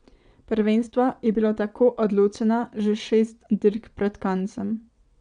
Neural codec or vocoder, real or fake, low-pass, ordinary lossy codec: vocoder, 22.05 kHz, 80 mel bands, WaveNeXt; fake; 9.9 kHz; none